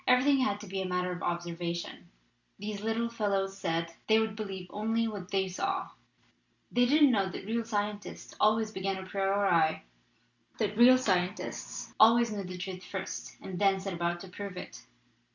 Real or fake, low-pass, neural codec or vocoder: real; 7.2 kHz; none